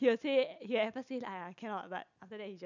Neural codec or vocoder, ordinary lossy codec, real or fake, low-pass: none; none; real; 7.2 kHz